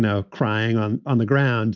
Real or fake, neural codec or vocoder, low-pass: real; none; 7.2 kHz